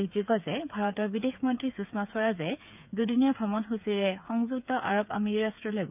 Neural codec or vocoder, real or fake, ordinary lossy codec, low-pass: codec, 16 kHz, 8 kbps, FreqCodec, smaller model; fake; none; 3.6 kHz